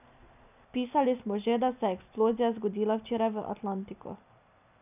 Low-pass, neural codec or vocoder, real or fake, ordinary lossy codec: 3.6 kHz; none; real; none